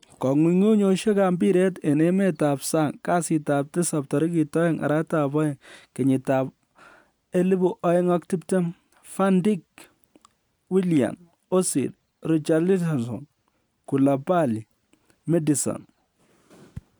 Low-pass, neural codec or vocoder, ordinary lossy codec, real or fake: none; none; none; real